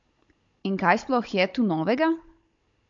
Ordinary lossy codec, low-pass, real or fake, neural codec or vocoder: MP3, 48 kbps; 7.2 kHz; fake; codec, 16 kHz, 16 kbps, FunCodec, trained on Chinese and English, 50 frames a second